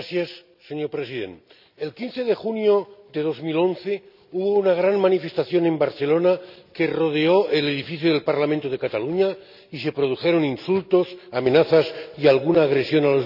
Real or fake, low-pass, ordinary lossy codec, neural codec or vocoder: real; 5.4 kHz; none; none